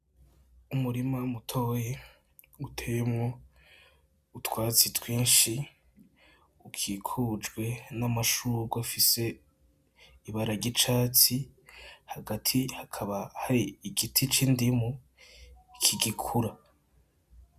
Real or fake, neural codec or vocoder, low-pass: real; none; 14.4 kHz